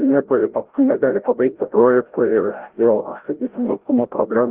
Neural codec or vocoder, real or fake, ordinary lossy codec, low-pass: codec, 16 kHz, 0.5 kbps, FreqCodec, larger model; fake; Opus, 16 kbps; 3.6 kHz